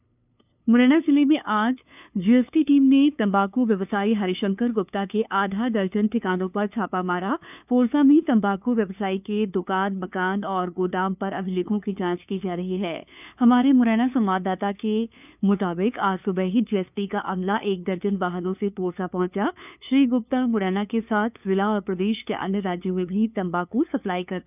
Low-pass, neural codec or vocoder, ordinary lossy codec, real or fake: 3.6 kHz; codec, 16 kHz, 2 kbps, FunCodec, trained on LibriTTS, 25 frames a second; none; fake